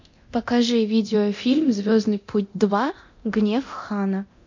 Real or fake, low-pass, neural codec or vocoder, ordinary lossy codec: fake; 7.2 kHz; codec, 24 kHz, 0.9 kbps, DualCodec; MP3, 48 kbps